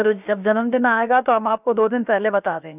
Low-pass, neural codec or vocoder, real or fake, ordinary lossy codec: 3.6 kHz; codec, 16 kHz, about 1 kbps, DyCAST, with the encoder's durations; fake; none